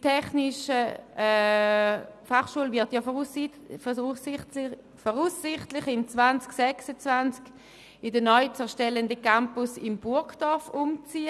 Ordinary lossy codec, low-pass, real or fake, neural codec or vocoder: none; none; real; none